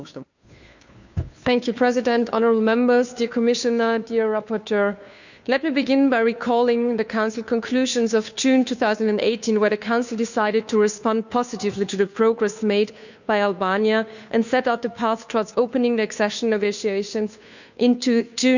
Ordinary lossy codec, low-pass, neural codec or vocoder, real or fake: none; 7.2 kHz; codec, 16 kHz, 2 kbps, FunCodec, trained on Chinese and English, 25 frames a second; fake